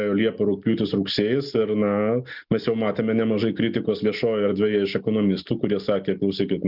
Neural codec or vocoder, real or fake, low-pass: none; real; 5.4 kHz